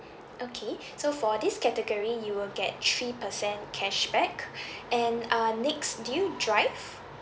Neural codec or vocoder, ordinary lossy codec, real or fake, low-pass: none; none; real; none